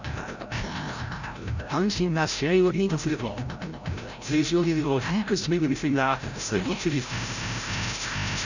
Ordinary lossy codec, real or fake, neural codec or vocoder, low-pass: none; fake; codec, 16 kHz, 0.5 kbps, FreqCodec, larger model; 7.2 kHz